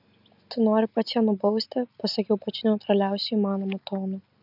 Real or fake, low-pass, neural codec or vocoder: real; 5.4 kHz; none